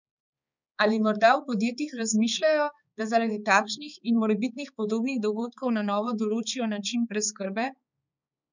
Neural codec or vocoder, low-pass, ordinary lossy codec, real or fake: codec, 16 kHz, 4 kbps, X-Codec, HuBERT features, trained on balanced general audio; 7.2 kHz; none; fake